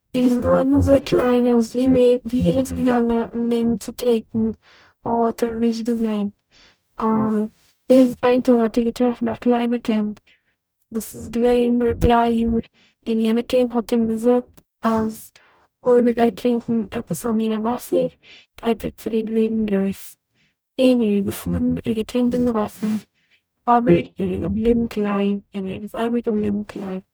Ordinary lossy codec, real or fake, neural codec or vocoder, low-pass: none; fake; codec, 44.1 kHz, 0.9 kbps, DAC; none